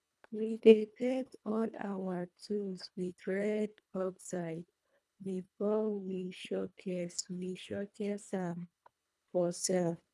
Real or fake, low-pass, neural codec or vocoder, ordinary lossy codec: fake; none; codec, 24 kHz, 1.5 kbps, HILCodec; none